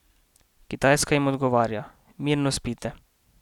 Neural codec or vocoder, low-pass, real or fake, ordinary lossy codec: none; 19.8 kHz; real; none